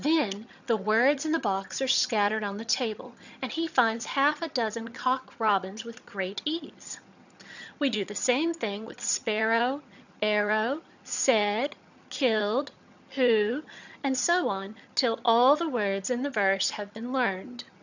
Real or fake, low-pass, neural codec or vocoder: fake; 7.2 kHz; vocoder, 22.05 kHz, 80 mel bands, HiFi-GAN